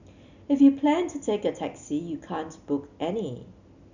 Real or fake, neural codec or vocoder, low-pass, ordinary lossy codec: real; none; 7.2 kHz; none